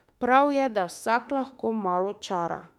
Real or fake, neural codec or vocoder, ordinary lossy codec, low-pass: fake; autoencoder, 48 kHz, 32 numbers a frame, DAC-VAE, trained on Japanese speech; MP3, 96 kbps; 19.8 kHz